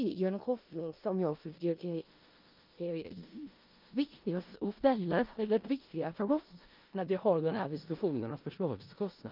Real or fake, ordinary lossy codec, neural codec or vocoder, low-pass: fake; Opus, 24 kbps; codec, 16 kHz in and 24 kHz out, 0.4 kbps, LongCat-Audio-Codec, four codebook decoder; 5.4 kHz